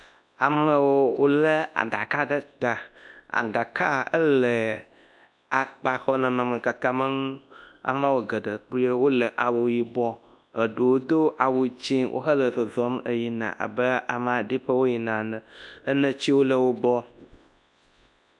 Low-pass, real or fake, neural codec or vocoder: 10.8 kHz; fake; codec, 24 kHz, 0.9 kbps, WavTokenizer, large speech release